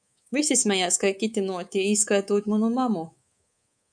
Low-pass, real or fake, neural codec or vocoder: 9.9 kHz; fake; codec, 24 kHz, 3.1 kbps, DualCodec